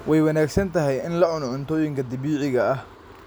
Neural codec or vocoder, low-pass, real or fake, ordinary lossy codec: none; none; real; none